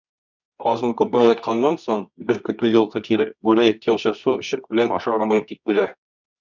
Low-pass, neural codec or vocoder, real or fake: 7.2 kHz; codec, 24 kHz, 0.9 kbps, WavTokenizer, medium music audio release; fake